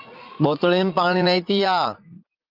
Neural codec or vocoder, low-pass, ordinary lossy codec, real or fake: vocoder, 44.1 kHz, 80 mel bands, Vocos; 5.4 kHz; Opus, 24 kbps; fake